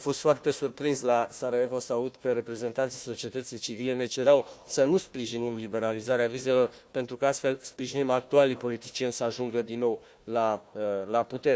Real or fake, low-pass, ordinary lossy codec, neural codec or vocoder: fake; none; none; codec, 16 kHz, 1 kbps, FunCodec, trained on Chinese and English, 50 frames a second